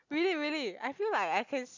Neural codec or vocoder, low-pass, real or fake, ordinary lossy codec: none; 7.2 kHz; real; none